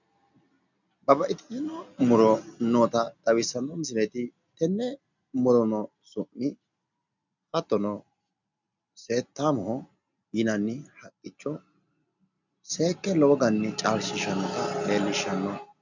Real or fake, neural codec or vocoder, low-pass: real; none; 7.2 kHz